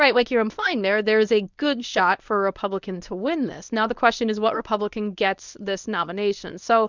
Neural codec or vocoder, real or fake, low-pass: codec, 24 kHz, 0.9 kbps, WavTokenizer, medium speech release version 1; fake; 7.2 kHz